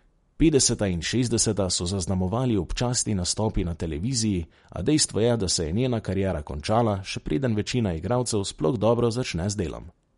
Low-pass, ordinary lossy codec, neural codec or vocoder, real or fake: 10.8 kHz; MP3, 48 kbps; none; real